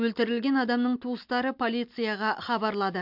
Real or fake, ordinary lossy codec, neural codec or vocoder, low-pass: real; MP3, 48 kbps; none; 5.4 kHz